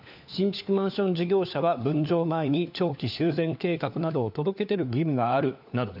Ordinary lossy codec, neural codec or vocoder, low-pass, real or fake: none; codec, 16 kHz, 4 kbps, FunCodec, trained on LibriTTS, 50 frames a second; 5.4 kHz; fake